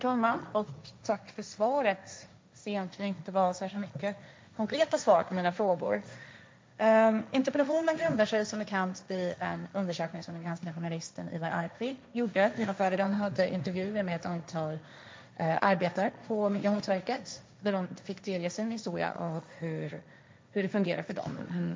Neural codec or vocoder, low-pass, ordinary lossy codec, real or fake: codec, 16 kHz, 1.1 kbps, Voila-Tokenizer; none; none; fake